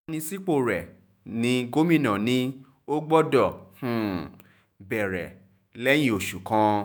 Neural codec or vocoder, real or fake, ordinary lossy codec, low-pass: autoencoder, 48 kHz, 128 numbers a frame, DAC-VAE, trained on Japanese speech; fake; none; none